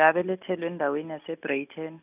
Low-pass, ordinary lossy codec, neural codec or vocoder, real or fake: 3.6 kHz; none; none; real